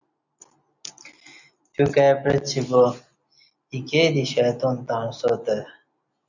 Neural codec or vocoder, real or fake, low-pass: none; real; 7.2 kHz